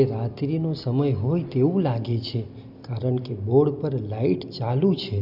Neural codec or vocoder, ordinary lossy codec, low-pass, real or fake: none; none; 5.4 kHz; real